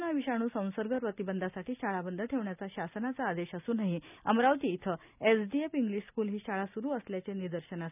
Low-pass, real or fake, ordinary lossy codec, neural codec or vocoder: 3.6 kHz; real; none; none